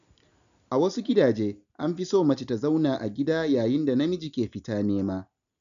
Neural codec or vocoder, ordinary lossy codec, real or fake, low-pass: none; none; real; 7.2 kHz